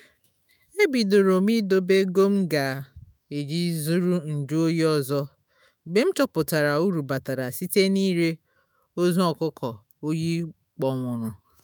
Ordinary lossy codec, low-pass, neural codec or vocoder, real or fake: none; none; autoencoder, 48 kHz, 128 numbers a frame, DAC-VAE, trained on Japanese speech; fake